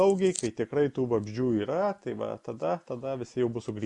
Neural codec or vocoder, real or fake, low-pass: none; real; 10.8 kHz